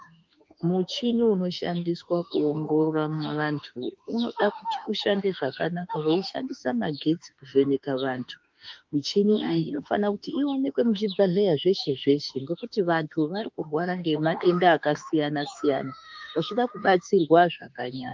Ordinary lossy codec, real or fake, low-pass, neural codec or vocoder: Opus, 24 kbps; fake; 7.2 kHz; autoencoder, 48 kHz, 32 numbers a frame, DAC-VAE, trained on Japanese speech